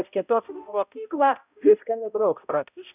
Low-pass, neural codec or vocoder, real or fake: 3.6 kHz; codec, 16 kHz, 0.5 kbps, X-Codec, HuBERT features, trained on balanced general audio; fake